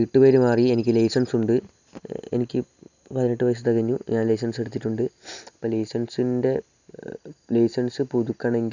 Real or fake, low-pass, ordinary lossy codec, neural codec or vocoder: real; 7.2 kHz; none; none